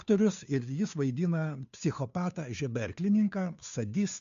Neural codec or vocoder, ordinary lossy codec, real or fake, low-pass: codec, 16 kHz, 2 kbps, FunCodec, trained on Chinese and English, 25 frames a second; MP3, 64 kbps; fake; 7.2 kHz